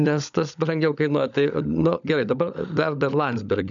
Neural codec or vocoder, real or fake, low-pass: codec, 16 kHz, 16 kbps, FunCodec, trained on LibriTTS, 50 frames a second; fake; 7.2 kHz